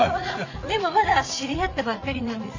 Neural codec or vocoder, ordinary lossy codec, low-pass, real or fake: vocoder, 44.1 kHz, 80 mel bands, Vocos; AAC, 48 kbps; 7.2 kHz; fake